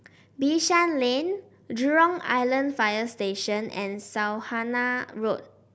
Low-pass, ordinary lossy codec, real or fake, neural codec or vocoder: none; none; real; none